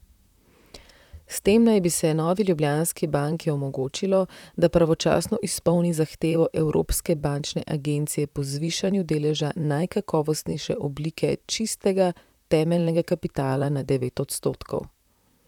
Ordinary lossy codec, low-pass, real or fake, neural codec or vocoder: none; 19.8 kHz; fake; vocoder, 44.1 kHz, 128 mel bands, Pupu-Vocoder